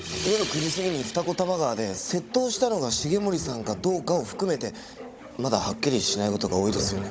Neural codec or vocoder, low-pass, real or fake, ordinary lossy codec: codec, 16 kHz, 16 kbps, FunCodec, trained on Chinese and English, 50 frames a second; none; fake; none